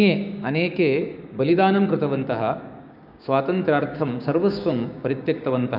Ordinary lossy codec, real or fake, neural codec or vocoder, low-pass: none; fake; codec, 16 kHz, 6 kbps, DAC; 5.4 kHz